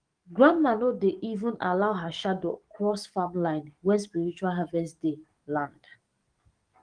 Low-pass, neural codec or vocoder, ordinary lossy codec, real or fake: 9.9 kHz; codec, 44.1 kHz, 7.8 kbps, DAC; Opus, 24 kbps; fake